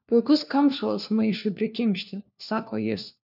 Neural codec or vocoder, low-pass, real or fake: codec, 16 kHz, 1 kbps, FunCodec, trained on LibriTTS, 50 frames a second; 5.4 kHz; fake